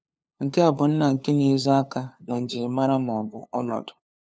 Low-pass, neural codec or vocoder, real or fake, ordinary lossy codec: none; codec, 16 kHz, 2 kbps, FunCodec, trained on LibriTTS, 25 frames a second; fake; none